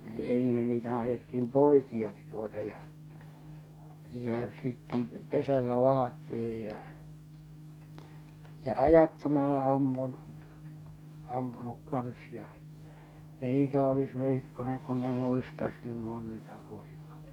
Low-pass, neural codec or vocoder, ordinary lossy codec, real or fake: 19.8 kHz; codec, 44.1 kHz, 2.6 kbps, DAC; none; fake